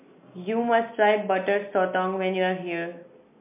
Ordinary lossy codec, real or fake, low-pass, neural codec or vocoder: MP3, 32 kbps; real; 3.6 kHz; none